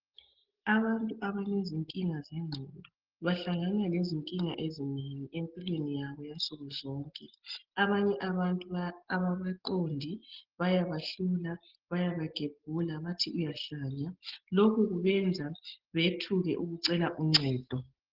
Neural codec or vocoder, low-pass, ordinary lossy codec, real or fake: none; 5.4 kHz; Opus, 16 kbps; real